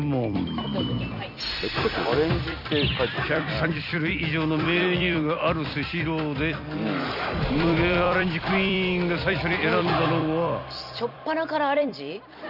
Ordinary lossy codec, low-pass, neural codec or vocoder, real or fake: none; 5.4 kHz; vocoder, 44.1 kHz, 128 mel bands every 256 samples, BigVGAN v2; fake